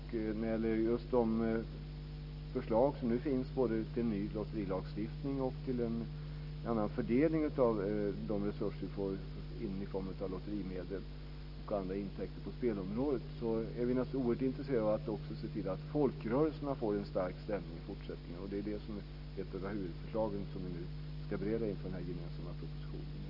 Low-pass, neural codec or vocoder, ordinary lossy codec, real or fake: 5.4 kHz; none; none; real